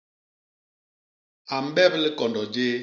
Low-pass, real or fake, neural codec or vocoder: 7.2 kHz; real; none